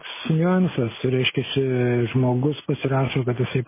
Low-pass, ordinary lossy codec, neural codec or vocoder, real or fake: 3.6 kHz; MP3, 16 kbps; none; real